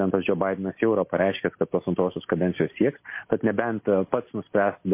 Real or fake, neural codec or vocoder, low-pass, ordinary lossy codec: real; none; 3.6 kHz; MP3, 24 kbps